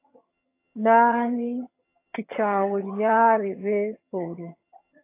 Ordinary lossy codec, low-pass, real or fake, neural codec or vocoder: AAC, 24 kbps; 3.6 kHz; fake; vocoder, 22.05 kHz, 80 mel bands, HiFi-GAN